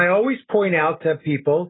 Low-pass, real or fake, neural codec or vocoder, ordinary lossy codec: 7.2 kHz; real; none; AAC, 16 kbps